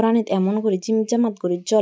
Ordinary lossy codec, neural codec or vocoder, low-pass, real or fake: none; none; none; real